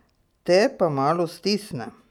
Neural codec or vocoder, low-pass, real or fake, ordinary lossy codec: none; 19.8 kHz; real; none